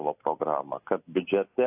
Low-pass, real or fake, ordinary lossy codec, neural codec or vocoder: 3.6 kHz; real; AAC, 24 kbps; none